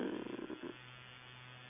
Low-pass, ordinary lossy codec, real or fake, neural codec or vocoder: 3.6 kHz; none; real; none